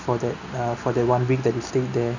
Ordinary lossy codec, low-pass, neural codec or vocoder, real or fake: none; 7.2 kHz; none; real